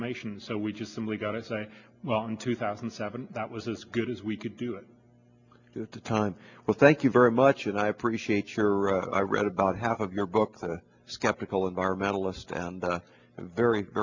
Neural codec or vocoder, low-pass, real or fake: none; 7.2 kHz; real